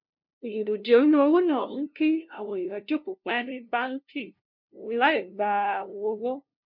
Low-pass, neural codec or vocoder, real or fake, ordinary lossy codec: 5.4 kHz; codec, 16 kHz, 0.5 kbps, FunCodec, trained on LibriTTS, 25 frames a second; fake; MP3, 48 kbps